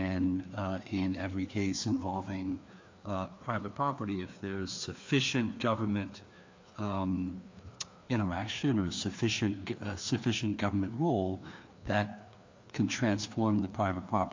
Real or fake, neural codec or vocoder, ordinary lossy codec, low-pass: fake; codec, 16 kHz, 2 kbps, FreqCodec, larger model; MP3, 48 kbps; 7.2 kHz